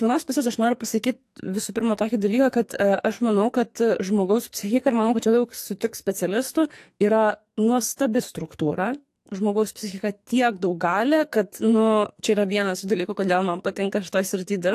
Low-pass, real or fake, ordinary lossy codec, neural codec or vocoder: 14.4 kHz; fake; AAC, 64 kbps; codec, 44.1 kHz, 2.6 kbps, SNAC